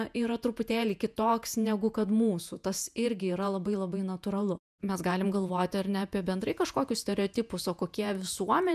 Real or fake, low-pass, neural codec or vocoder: fake; 14.4 kHz; vocoder, 48 kHz, 128 mel bands, Vocos